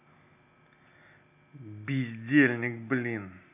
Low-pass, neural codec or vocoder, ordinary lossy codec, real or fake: 3.6 kHz; none; none; real